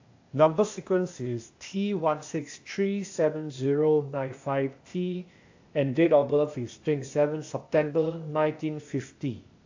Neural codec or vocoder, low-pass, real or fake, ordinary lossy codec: codec, 16 kHz, 0.8 kbps, ZipCodec; 7.2 kHz; fake; AAC, 48 kbps